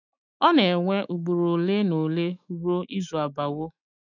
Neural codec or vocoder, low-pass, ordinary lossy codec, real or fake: autoencoder, 48 kHz, 128 numbers a frame, DAC-VAE, trained on Japanese speech; 7.2 kHz; none; fake